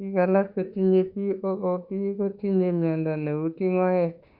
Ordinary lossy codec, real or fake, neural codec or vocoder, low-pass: Opus, 64 kbps; fake; autoencoder, 48 kHz, 32 numbers a frame, DAC-VAE, trained on Japanese speech; 5.4 kHz